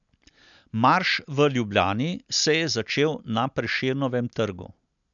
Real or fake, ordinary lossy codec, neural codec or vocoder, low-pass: real; none; none; 7.2 kHz